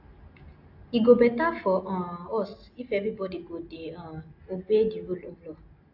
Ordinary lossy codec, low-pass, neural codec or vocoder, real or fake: AAC, 48 kbps; 5.4 kHz; none; real